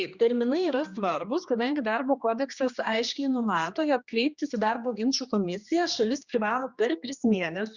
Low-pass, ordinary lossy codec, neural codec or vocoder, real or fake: 7.2 kHz; Opus, 64 kbps; codec, 16 kHz, 2 kbps, X-Codec, HuBERT features, trained on general audio; fake